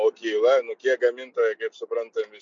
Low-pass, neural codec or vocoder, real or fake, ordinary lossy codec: 7.2 kHz; none; real; MP3, 48 kbps